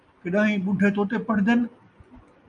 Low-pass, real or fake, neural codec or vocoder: 9.9 kHz; real; none